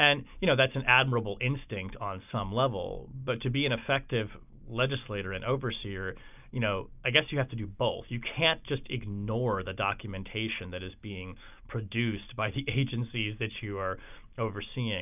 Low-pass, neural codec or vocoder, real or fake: 3.6 kHz; none; real